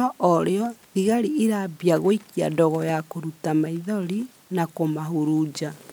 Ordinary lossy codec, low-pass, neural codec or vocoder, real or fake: none; none; none; real